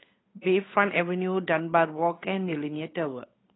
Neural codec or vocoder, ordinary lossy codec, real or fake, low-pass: codec, 16 kHz, 8 kbps, FunCodec, trained on Chinese and English, 25 frames a second; AAC, 16 kbps; fake; 7.2 kHz